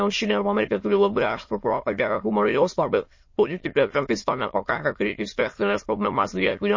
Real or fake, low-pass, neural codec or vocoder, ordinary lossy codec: fake; 7.2 kHz; autoencoder, 22.05 kHz, a latent of 192 numbers a frame, VITS, trained on many speakers; MP3, 32 kbps